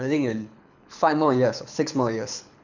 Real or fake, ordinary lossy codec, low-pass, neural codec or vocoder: fake; none; 7.2 kHz; codec, 24 kHz, 6 kbps, HILCodec